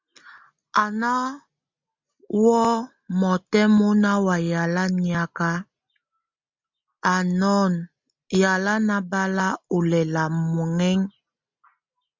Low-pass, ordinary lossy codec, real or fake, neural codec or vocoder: 7.2 kHz; MP3, 64 kbps; real; none